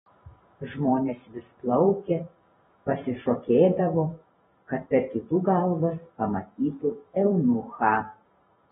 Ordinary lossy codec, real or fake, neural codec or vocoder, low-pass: AAC, 16 kbps; fake; vocoder, 44.1 kHz, 128 mel bands every 512 samples, BigVGAN v2; 19.8 kHz